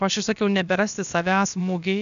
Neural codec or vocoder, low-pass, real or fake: codec, 16 kHz, about 1 kbps, DyCAST, with the encoder's durations; 7.2 kHz; fake